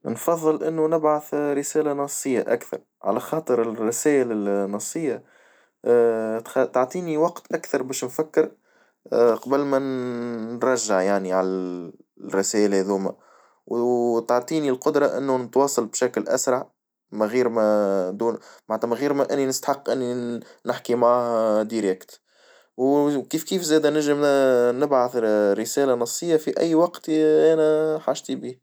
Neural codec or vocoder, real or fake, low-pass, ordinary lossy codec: none; real; none; none